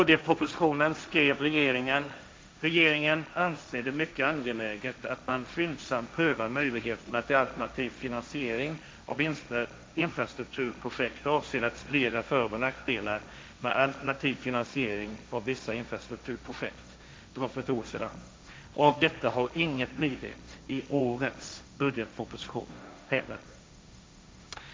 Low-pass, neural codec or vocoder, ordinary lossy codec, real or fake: none; codec, 16 kHz, 1.1 kbps, Voila-Tokenizer; none; fake